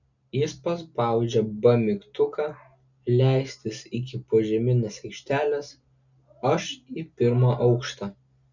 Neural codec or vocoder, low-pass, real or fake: none; 7.2 kHz; real